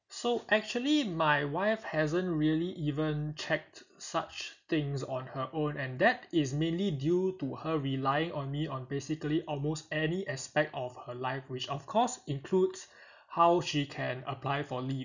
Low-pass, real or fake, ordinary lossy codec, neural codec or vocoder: 7.2 kHz; real; none; none